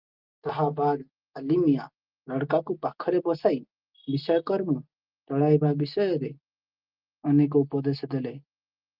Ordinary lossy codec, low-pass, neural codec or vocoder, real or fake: Opus, 32 kbps; 5.4 kHz; none; real